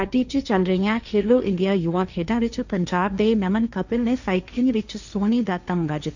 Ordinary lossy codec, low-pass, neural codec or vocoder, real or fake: none; 7.2 kHz; codec, 16 kHz, 1.1 kbps, Voila-Tokenizer; fake